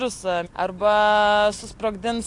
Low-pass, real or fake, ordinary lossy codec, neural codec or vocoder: 10.8 kHz; real; AAC, 48 kbps; none